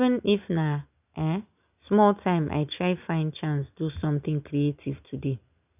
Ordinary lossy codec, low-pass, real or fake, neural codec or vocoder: none; 3.6 kHz; real; none